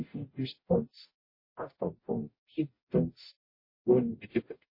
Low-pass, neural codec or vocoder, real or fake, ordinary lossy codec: 5.4 kHz; codec, 44.1 kHz, 0.9 kbps, DAC; fake; MP3, 24 kbps